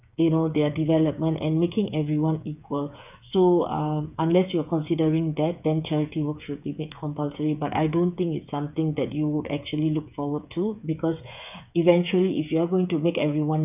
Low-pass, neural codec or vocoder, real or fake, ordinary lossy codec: 3.6 kHz; codec, 16 kHz, 8 kbps, FreqCodec, smaller model; fake; none